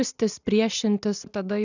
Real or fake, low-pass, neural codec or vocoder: real; 7.2 kHz; none